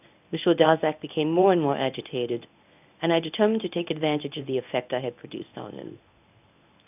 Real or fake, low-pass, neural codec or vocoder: fake; 3.6 kHz; codec, 24 kHz, 0.9 kbps, WavTokenizer, medium speech release version 1